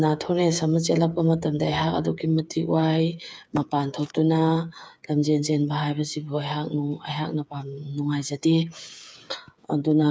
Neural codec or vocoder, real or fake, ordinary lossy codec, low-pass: codec, 16 kHz, 8 kbps, FreqCodec, smaller model; fake; none; none